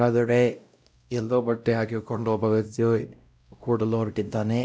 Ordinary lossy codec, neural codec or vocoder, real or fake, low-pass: none; codec, 16 kHz, 0.5 kbps, X-Codec, WavLM features, trained on Multilingual LibriSpeech; fake; none